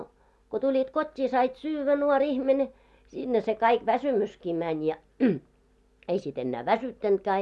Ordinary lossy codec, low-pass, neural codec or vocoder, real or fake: none; none; none; real